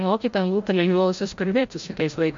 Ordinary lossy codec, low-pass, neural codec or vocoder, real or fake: MP3, 48 kbps; 7.2 kHz; codec, 16 kHz, 0.5 kbps, FreqCodec, larger model; fake